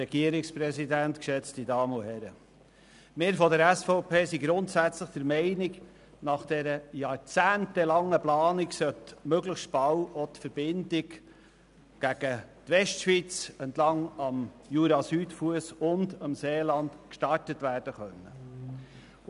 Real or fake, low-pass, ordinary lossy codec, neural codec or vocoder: real; 10.8 kHz; none; none